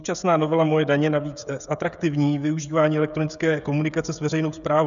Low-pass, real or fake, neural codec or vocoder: 7.2 kHz; fake; codec, 16 kHz, 16 kbps, FreqCodec, smaller model